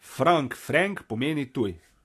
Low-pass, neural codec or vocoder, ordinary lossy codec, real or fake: 14.4 kHz; none; AAC, 64 kbps; real